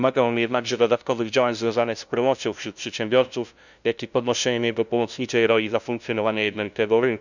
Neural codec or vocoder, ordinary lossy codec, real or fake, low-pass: codec, 16 kHz, 0.5 kbps, FunCodec, trained on LibriTTS, 25 frames a second; none; fake; 7.2 kHz